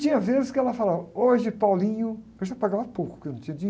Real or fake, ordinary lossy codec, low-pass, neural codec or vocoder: real; none; none; none